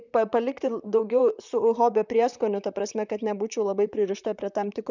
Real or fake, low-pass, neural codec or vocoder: fake; 7.2 kHz; vocoder, 44.1 kHz, 128 mel bands, Pupu-Vocoder